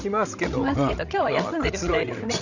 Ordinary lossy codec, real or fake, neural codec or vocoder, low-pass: none; fake; codec, 16 kHz, 16 kbps, FreqCodec, larger model; 7.2 kHz